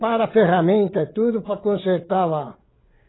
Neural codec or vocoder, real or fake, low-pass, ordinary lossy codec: codec, 16 kHz, 16 kbps, FreqCodec, larger model; fake; 7.2 kHz; AAC, 16 kbps